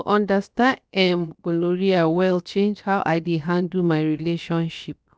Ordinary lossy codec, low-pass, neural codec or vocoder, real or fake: none; none; codec, 16 kHz, 0.7 kbps, FocalCodec; fake